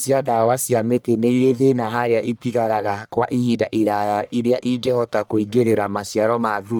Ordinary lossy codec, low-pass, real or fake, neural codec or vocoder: none; none; fake; codec, 44.1 kHz, 1.7 kbps, Pupu-Codec